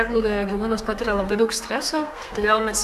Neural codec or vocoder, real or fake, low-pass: codec, 32 kHz, 1.9 kbps, SNAC; fake; 14.4 kHz